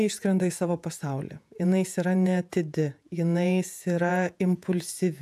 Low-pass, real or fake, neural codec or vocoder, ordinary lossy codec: 14.4 kHz; fake; vocoder, 48 kHz, 128 mel bands, Vocos; AAC, 96 kbps